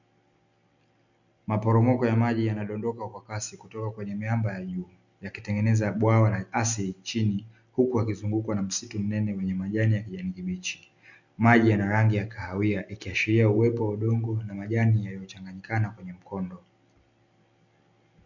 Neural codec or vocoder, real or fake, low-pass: none; real; 7.2 kHz